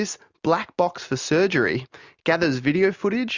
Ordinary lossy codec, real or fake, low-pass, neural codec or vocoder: Opus, 64 kbps; real; 7.2 kHz; none